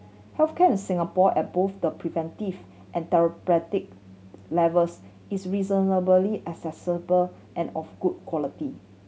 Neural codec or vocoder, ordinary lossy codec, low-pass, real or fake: none; none; none; real